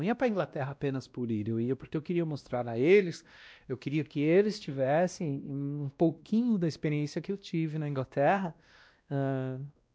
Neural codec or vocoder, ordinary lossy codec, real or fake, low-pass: codec, 16 kHz, 1 kbps, X-Codec, WavLM features, trained on Multilingual LibriSpeech; none; fake; none